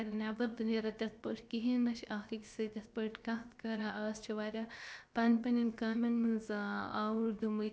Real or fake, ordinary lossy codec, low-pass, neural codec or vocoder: fake; none; none; codec, 16 kHz, about 1 kbps, DyCAST, with the encoder's durations